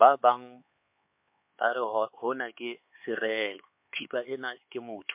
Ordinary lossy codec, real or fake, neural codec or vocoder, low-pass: MP3, 32 kbps; fake; codec, 16 kHz, 4 kbps, X-Codec, HuBERT features, trained on LibriSpeech; 3.6 kHz